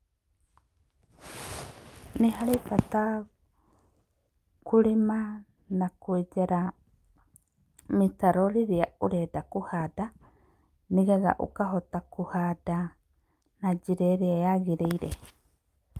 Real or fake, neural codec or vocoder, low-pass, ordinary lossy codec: real; none; 14.4 kHz; Opus, 32 kbps